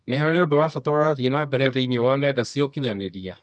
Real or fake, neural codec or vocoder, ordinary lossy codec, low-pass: fake; codec, 24 kHz, 0.9 kbps, WavTokenizer, medium music audio release; none; 9.9 kHz